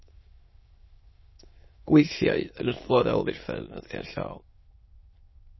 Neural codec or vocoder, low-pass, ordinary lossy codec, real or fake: autoencoder, 22.05 kHz, a latent of 192 numbers a frame, VITS, trained on many speakers; 7.2 kHz; MP3, 24 kbps; fake